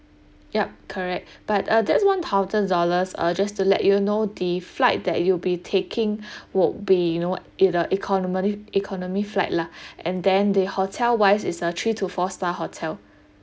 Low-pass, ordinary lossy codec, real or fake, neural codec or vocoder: none; none; real; none